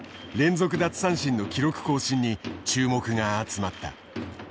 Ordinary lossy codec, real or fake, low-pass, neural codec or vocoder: none; real; none; none